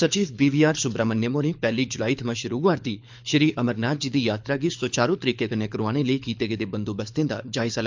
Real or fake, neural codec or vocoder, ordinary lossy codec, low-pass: fake; codec, 24 kHz, 6 kbps, HILCodec; MP3, 64 kbps; 7.2 kHz